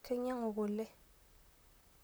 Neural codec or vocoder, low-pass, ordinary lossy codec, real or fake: vocoder, 44.1 kHz, 128 mel bands, Pupu-Vocoder; none; none; fake